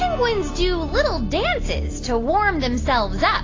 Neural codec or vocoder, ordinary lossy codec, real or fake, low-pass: none; AAC, 32 kbps; real; 7.2 kHz